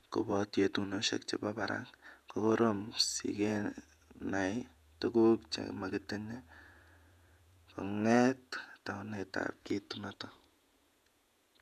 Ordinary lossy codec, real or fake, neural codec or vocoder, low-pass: none; fake; vocoder, 48 kHz, 128 mel bands, Vocos; 14.4 kHz